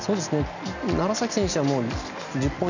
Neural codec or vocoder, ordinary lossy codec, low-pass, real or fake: none; none; 7.2 kHz; real